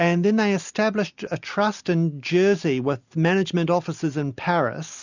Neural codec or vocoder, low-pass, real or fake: none; 7.2 kHz; real